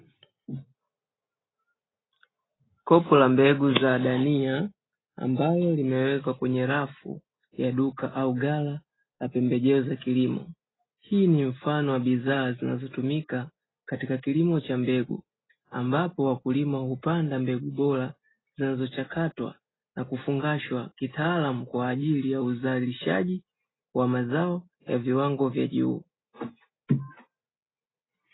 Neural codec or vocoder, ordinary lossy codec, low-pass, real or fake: none; AAC, 16 kbps; 7.2 kHz; real